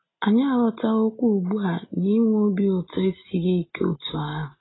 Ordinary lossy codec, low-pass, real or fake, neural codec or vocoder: AAC, 16 kbps; 7.2 kHz; real; none